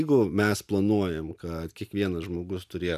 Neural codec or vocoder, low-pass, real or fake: none; 14.4 kHz; real